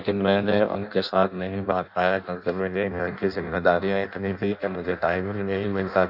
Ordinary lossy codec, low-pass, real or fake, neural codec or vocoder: AAC, 48 kbps; 5.4 kHz; fake; codec, 16 kHz in and 24 kHz out, 0.6 kbps, FireRedTTS-2 codec